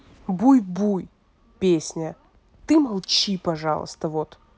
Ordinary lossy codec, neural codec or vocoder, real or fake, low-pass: none; none; real; none